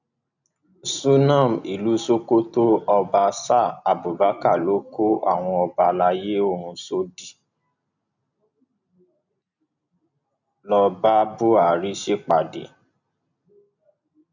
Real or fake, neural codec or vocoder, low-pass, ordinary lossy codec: real; none; 7.2 kHz; none